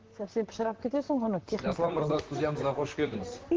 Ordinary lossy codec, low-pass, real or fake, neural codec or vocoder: Opus, 16 kbps; 7.2 kHz; fake; vocoder, 44.1 kHz, 128 mel bands, Pupu-Vocoder